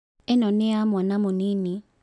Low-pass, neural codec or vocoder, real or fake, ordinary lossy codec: 10.8 kHz; none; real; none